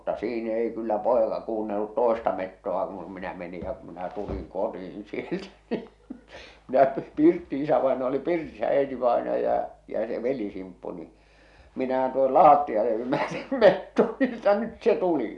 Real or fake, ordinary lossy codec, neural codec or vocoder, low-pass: real; none; none; 10.8 kHz